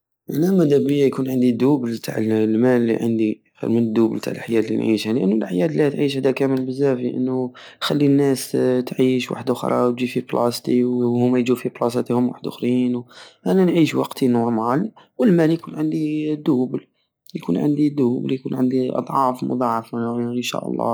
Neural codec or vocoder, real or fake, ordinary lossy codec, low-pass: none; real; none; none